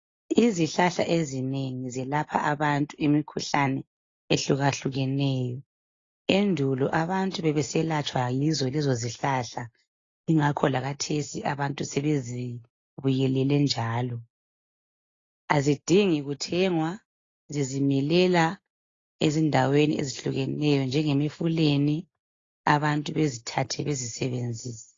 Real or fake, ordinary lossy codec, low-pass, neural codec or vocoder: real; AAC, 32 kbps; 7.2 kHz; none